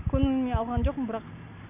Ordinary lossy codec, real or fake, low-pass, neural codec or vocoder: none; real; 3.6 kHz; none